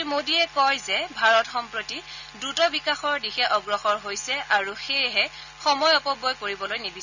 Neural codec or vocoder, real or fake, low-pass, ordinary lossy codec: none; real; 7.2 kHz; none